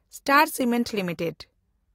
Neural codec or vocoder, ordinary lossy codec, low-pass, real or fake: none; AAC, 48 kbps; 19.8 kHz; real